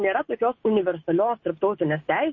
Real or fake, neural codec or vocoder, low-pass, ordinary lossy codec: real; none; 7.2 kHz; MP3, 32 kbps